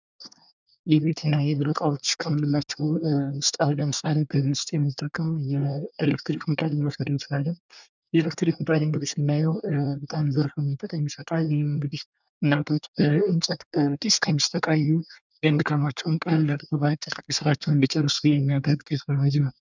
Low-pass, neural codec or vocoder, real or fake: 7.2 kHz; codec, 24 kHz, 1 kbps, SNAC; fake